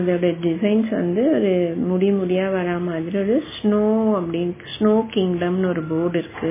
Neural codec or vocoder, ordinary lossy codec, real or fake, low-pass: none; MP3, 16 kbps; real; 3.6 kHz